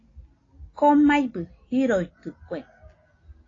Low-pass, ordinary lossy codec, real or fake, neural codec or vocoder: 7.2 kHz; AAC, 32 kbps; real; none